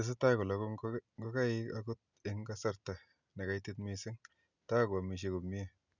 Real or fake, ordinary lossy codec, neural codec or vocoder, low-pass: real; none; none; 7.2 kHz